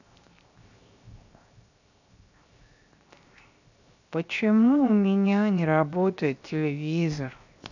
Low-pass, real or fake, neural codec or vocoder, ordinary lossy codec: 7.2 kHz; fake; codec, 16 kHz, 0.7 kbps, FocalCodec; none